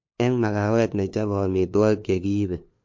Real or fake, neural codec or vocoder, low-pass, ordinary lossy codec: fake; codec, 16 kHz, 2 kbps, FunCodec, trained on LibriTTS, 25 frames a second; 7.2 kHz; MP3, 48 kbps